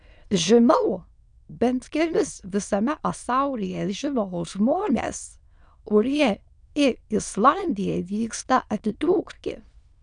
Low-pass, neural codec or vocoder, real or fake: 9.9 kHz; autoencoder, 22.05 kHz, a latent of 192 numbers a frame, VITS, trained on many speakers; fake